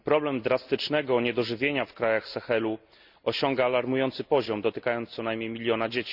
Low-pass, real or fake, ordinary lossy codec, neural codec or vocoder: 5.4 kHz; real; Opus, 64 kbps; none